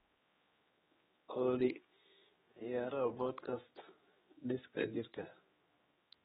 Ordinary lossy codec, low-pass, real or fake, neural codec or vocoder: AAC, 16 kbps; 7.2 kHz; fake; codec, 16 kHz, 2 kbps, X-Codec, HuBERT features, trained on general audio